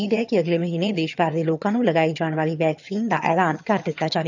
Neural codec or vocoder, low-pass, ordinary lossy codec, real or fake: vocoder, 22.05 kHz, 80 mel bands, HiFi-GAN; 7.2 kHz; none; fake